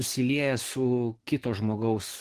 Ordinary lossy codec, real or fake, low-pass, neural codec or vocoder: Opus, 16 kbps; fake; 14.4 kHz; codec, 44.1 kHz, 7.8 kbps, DAC